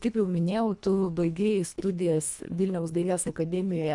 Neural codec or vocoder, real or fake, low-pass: codec, 24 kHz, 1.5 kbps, HILCodec; fake; 10.8 kHz